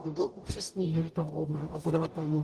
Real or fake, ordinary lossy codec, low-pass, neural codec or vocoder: fake; Opus, 32 kbps; 14.4 kHz; codec, 44.1 kHz, 0.9 kbps, DAC